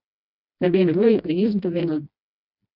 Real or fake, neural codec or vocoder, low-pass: fake; codec, 16 kHz, 1 kbps, FreqCodec, smaller model; 5.4 kHz